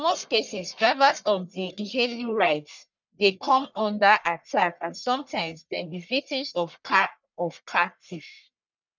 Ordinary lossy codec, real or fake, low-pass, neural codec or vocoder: none; fake; 7.2 kHz; codec, 44.1 kHz, 1.7 kbps, Pupu-Codec